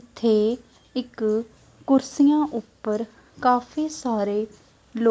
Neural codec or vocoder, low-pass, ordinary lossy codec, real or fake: none; none; none; real